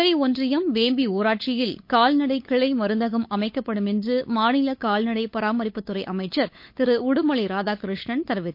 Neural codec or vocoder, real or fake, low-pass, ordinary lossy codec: codec, 16 kHz, 8 kbps, FunCodec, trained on LibriTTS, 25 frames a second; fake; 5.4 kHz; MP3, 32 kbps